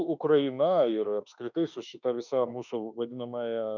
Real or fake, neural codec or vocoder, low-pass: fake; autoencoder, 48 kHz, 32 numbers a frame, DAC-VAE, trained on Japanese speech; 7.2 kHz